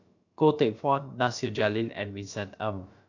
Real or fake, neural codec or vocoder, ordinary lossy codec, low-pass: fake; codec, 16 kHz, about 1 kbps, DyCAST, with the encoder's durations; AAC, 48 kbps; 7.2 kHz